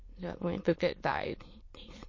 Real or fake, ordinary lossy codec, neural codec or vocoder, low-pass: fake; MP3, 32 kbps; autoencoder, 22.05 kHz, a latent of 192 numbers a frame, VITS, trained on many speakers; 7.2 kHz